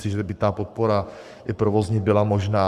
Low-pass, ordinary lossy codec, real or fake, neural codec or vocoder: 14.4 kHz; Opus, 64 kbps; fake; codec, 44.1 kHz, 7.8 kbps, Pupu-Codec